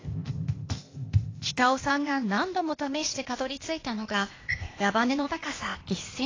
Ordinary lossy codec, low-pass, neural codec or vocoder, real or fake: AAC, 32 kbps; 7.2 kHz; codec, 16 kHz, 0.8 kbps, ZipCodec; fake